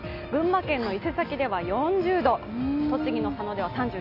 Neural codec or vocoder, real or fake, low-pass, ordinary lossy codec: none; real; 5.4 kHz; AAC, 32 kbps